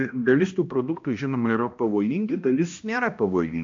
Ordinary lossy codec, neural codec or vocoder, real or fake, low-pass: MP3, 48 kbps; codec, 16 kHz, 1 kbps, X-Codec, HuBERT features, trained on balanced general audio; fake; 7.2 kHz